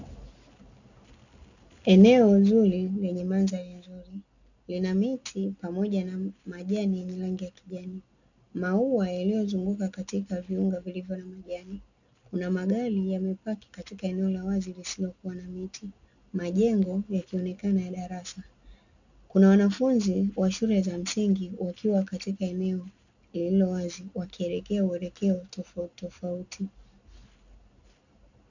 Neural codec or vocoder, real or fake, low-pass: none; real; 7.2 kHz